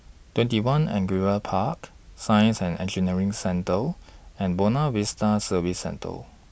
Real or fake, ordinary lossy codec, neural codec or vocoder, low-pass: real; none; none; none